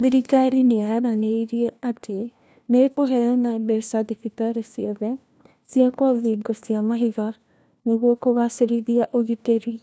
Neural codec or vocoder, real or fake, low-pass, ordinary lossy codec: codec, 16 kHz, 1 kbps, FunCodec, trained on LibriTTS, 50 frames a second; fake; none; none